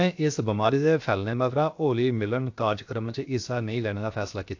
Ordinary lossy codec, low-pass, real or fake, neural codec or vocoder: AAC, 48 kbps; 7.2 kHz; fake; codec, 16 kHz, 0.7 kbps, FocalCodec